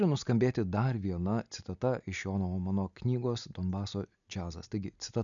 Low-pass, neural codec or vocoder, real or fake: 7.2 kHz; none; real